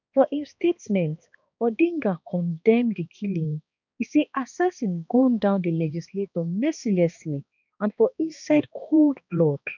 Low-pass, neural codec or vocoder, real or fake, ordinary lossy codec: 7.2 kHz; codec, 16 kHz, 2 kbps, X-Codec, HuBERT features, trained on balanced general audio; fake; none